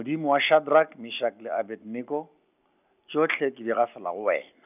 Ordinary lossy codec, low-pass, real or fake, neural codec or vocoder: none; 3.6 kHz; real; none